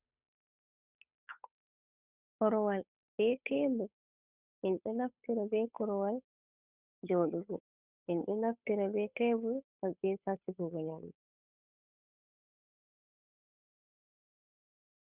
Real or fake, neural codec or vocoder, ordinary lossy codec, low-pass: fake; codec, 16 kHz, 8 kbps, FunCodec, trained on Chinese and English, 25 frames a second; Opus, 64 kbps; 3.6 kHz